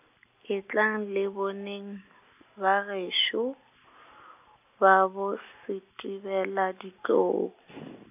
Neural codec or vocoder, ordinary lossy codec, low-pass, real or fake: none; AAC, 32 kbps; 3.6 kHz; real